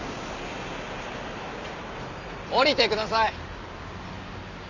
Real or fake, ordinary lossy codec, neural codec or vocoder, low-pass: real; none; none; 7.2 kHz